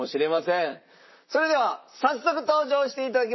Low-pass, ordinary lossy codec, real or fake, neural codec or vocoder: 7.2 kHz; MP3, 24 kbps; fake; vocoder, 44.1 kHz, 128 mel bands, Pupu-Vocoder